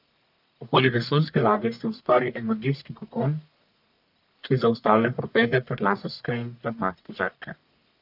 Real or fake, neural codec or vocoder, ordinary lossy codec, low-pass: fake; codec, 44.1 kHz, 1.7 kbps, Pupu-Codec; none; 5.4 kHz